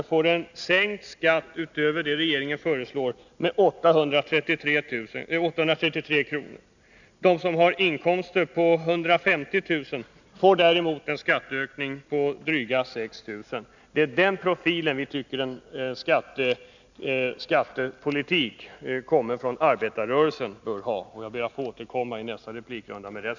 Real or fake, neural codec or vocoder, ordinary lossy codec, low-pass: real; none; none; 7.2 kHz